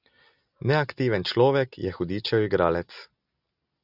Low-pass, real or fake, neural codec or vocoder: 5.4 kHz; real; none